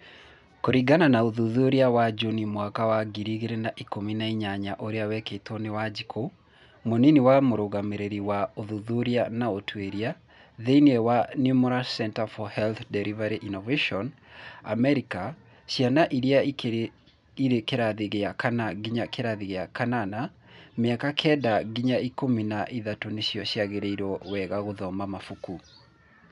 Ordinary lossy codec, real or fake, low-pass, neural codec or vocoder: none; real; 10.8 kHz; none